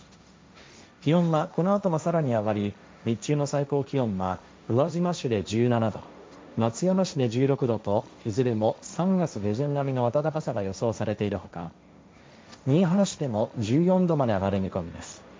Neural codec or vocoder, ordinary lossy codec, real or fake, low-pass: codec, 16 kHz, 1.1 kbps, Voila-Tokenizer; none; fake; none